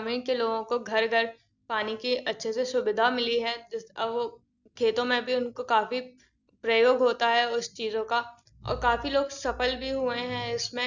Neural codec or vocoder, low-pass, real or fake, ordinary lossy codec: none; 7.2 kHz; real; none